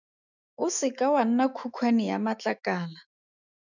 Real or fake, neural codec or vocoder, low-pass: fake; autoencoder, 48 kHz, 128 numbers a frame, DAC-VAE, trained on Japanese speech; 7.2 kHz